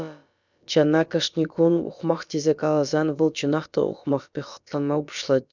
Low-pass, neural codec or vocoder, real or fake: 7.2 kHz; codec, 16 kHz, about 1 kbps, DyCAST, with the encoder's durations; fake